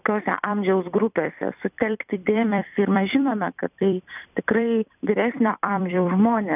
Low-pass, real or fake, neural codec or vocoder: 3.6 kHz; fake; vocoder, 44.1 kHz, 128 mel bands every 256 samples, BigVGAN v2